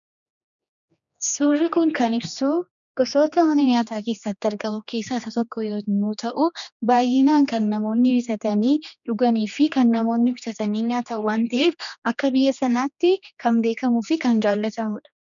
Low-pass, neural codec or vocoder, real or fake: 7.2 kHz; codec, 16 kHz, 2 kbps, X-Codec, HuBERT features, trained on general audio; fake